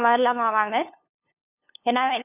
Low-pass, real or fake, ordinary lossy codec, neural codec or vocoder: 3.6 kHz; fake; none; codec, 16 kHz, 2 kbps, FunCodec, trained on LibriTTS, 25 frames a second